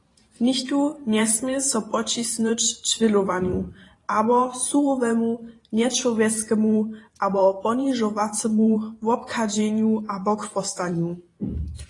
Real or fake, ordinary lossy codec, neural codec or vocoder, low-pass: fake; AAC, 32 kbps; vocoder, 44.1 kHz, 128 mel bands every 512 samples, BigVGAN v2; 10.8 kHz